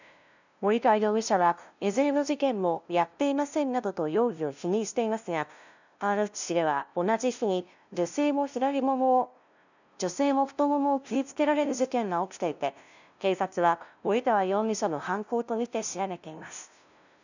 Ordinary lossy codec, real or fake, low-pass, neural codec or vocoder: none; fake; 7.2 kHz; codec, 16 kHz, 0.5 kbps, FunCodec, trained on LibriTTS, 25 frames a second